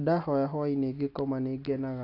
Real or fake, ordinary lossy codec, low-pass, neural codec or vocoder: real; AAC, 32 kbps; 5.4 kHz; none